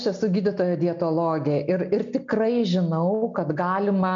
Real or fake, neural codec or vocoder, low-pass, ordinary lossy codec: real; none; 7.2 kHz; MP3, 48 kbps